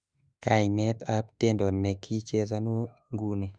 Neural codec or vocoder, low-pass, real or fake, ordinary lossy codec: autoencoder, 48 kHz, 32 numbers a frame, DAC-VAE, trained on Japanese speech; 9.9 kHz; fake; Opus, 64 kbps